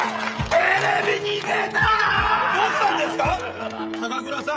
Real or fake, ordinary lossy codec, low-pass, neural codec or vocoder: fake; none; none; codec, 16 kHz, 16 kbps, FreqCodec, smaller model